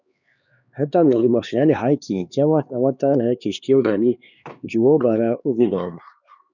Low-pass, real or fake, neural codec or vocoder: 7.2 kHz; fake; codec, 16 kHz, 2 kbps, X-Codec, HuBERT features, trained on LibriSpeech